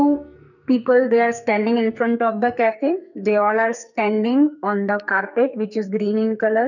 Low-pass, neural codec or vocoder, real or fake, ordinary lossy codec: 7.2 kHz; codec, 44.1 kHz, 2.6 kbps, SNAC; fake; none